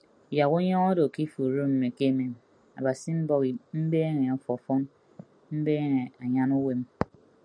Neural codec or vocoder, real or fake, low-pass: none; real; 9.9 kHz